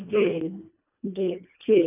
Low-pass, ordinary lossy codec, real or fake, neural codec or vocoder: 3.6 kHz; none; fake; codec, 24 kHz, 1.5 kbps, HILCodec